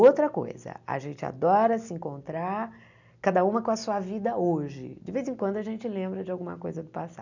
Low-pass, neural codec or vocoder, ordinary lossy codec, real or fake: 7.2 kHz; none; none; real